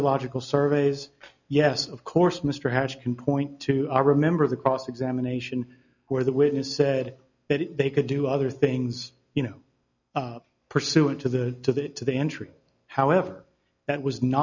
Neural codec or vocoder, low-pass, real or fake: none; 7.2 kHz; real